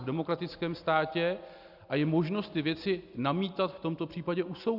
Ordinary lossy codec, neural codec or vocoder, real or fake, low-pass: Opus, 64 kbps; none; real; 5.4 kHz